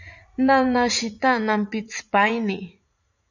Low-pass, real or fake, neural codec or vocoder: 7.2 kHz; fake; vocoder, 44.1 kHz, 80 mel bands, Vocos